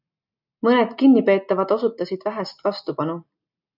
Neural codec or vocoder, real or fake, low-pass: none; real; 5.4 kHz